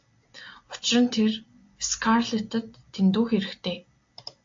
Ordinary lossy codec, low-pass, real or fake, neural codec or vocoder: AAC, 48 kbps; 7.2 kHz; real; none